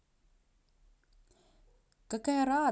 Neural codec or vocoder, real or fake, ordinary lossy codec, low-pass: none; real; none; none